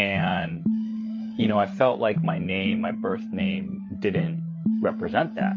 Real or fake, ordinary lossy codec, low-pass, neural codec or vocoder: fake; MP3, 32 kbps; 7.2 kHz; codec, 16 kHz, 8 kbps, FreqCodec, larger model